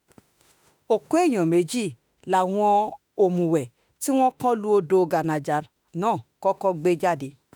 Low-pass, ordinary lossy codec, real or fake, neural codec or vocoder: none; none; fake; autoencoder, 48 kHz, 32 numbers a frame, DAC-VAE, trained on Japanese speech